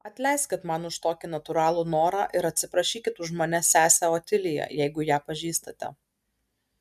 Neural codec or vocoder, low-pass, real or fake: none; 14.4 kHz; real